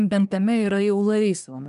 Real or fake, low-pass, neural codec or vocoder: fake; 10.8 kHz; codec, 24 kHz, 1 kbps, SNAC